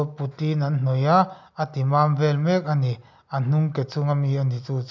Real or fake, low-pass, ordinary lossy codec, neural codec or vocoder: real; 7.2 kHz; none; none